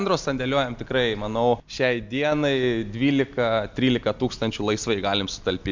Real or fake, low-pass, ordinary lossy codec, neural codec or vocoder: real; 7.2 kHz; MP3, 64 kbps; none